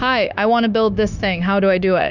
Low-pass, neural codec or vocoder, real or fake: 7.2 kHz; codec, 16 kHz, 0.9 kbps, LongCat-Audio-Codec; fake